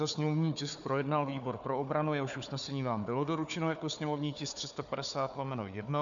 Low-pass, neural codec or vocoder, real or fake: 7.2 kHz; codec, 16 kHz, 4 kbps, FunCodec, trained on Chinese and English, 50 frames a second; fake